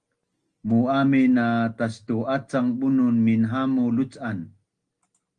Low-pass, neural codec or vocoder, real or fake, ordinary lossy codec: 9.9 kHz; none; real; Opus, 24 kbps